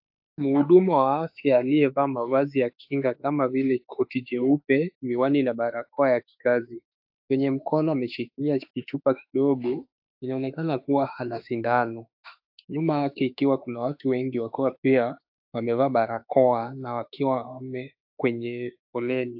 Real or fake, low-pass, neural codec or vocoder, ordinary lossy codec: fake; 5.4 kHz; autoencoder, 48 kHz, 32 numbers a frame, DAC-VAE, trained on Japanese speech; AAC, 48 kbps